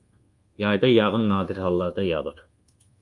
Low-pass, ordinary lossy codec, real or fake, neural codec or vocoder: 10.8 kHz; Opus, 32 kbps; fake; codec, 24 kHz, 1.2 kbps, DualCodec